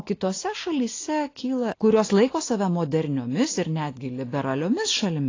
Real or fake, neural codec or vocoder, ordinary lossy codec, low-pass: real; none; AAC, 32 kbps; 7.2 kHz